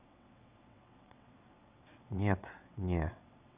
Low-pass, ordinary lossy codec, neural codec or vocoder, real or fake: 3.6 kHz; none; vocoder, 22.05 kHz, 80 mel bands, WaveNeXt; fake